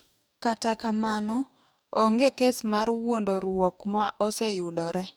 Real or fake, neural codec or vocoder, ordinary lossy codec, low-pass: fake; codec, 44.1 kHz, 2.6 kbps, DAC; none; none